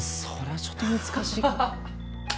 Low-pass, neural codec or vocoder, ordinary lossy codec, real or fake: none; none; none; real